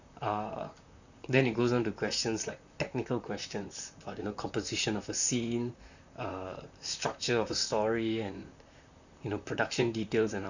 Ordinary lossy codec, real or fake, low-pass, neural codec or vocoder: none; fake; 7.2 kHz; vocoder, 44.1 kHz, 128 mel bands, Pupu-Vocoder